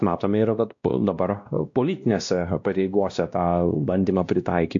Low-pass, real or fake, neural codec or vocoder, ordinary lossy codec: 7.2 kHz; fake; codec, 16 kHz, 1 kbps, X-Codec, WavLM features, trained on Multilingual LibriSpeech; AAC, 64 kbps